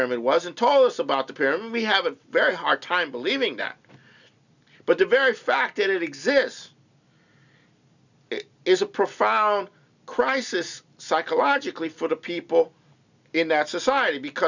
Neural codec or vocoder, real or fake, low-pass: none; real; 7.2 kHz